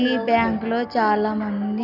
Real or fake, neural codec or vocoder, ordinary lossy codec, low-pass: real; none; none; 5.4 kHz